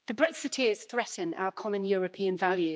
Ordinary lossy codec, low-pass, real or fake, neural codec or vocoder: none; none; fake; codec, 16 kHz, 2 kbps, X-Codec, HuBERT features, trained on general audio